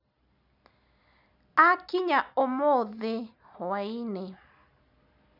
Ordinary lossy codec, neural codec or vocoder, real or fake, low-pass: none; none; real; 5.4 kHz